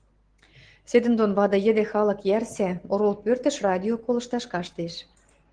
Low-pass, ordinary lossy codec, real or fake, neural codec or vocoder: 9.9 kHz; Opus, 16 kbps; real; none